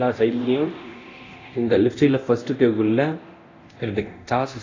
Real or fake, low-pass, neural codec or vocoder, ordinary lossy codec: fake; 7.2 kHz; codec, 24 kHz, 0.9 kbps, DualCodec; AAC, 32 kbps